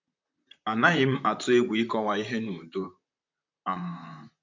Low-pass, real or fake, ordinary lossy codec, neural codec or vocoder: 7.2 kHz; fake; MP3, 64 kbps; vocoder, 44.1 kHz, 128 mel bands, Pupu-Vocoder